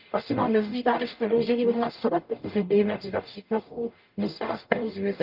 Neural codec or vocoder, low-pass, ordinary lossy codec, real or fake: codec, 44.1 kHz, 0.9 kbps, DAC; 5.4 kHz; Opus, 24 kbps; fake